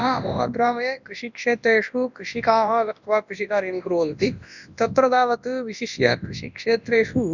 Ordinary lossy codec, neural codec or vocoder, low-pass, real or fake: none; codec, 24 kHz, 0.9 kbps, WavTokenizer, large speech release; 7.2 kHz; fake